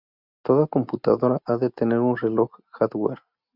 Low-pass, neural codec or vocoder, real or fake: 5.4 kHz; none; real